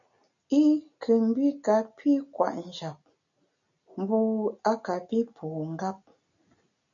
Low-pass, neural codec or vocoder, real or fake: 7.2 kHz; none; real